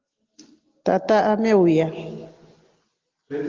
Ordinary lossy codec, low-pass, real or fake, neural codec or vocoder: Opus, 16 kbps; 7.2 kHz; real; none